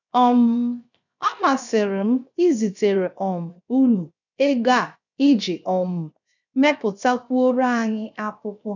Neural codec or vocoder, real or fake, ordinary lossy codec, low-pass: codec, 16 kHz, 0.7 kbps, FocalCodec; fake; none; 7.2 kHz